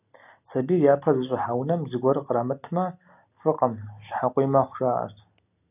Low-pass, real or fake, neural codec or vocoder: 3.6 kHz; real; none